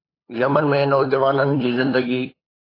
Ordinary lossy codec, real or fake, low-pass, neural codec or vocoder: AAC, 24 kbps; fake; 5.4 kHz; codec, 16 kHz, 8 kbps, FunCodec, trained on LibriTTS, 25 frames a second